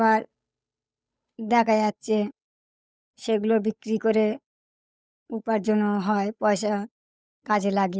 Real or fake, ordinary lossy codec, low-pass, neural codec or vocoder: fake; none; none; codec, 16 kHz, 8 kbps, FunCodec, trained on Chinese and English, 25 frames a second